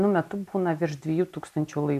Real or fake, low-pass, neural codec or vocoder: real; 14.4 kHz; none